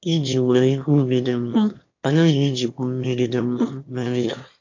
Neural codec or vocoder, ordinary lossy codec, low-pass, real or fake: autoencoder, 22.05 kHz, a latent of 192 numbers a frame, VITS, trained on one speaker; AAC, 48 kbps; 7.2 kHz; fake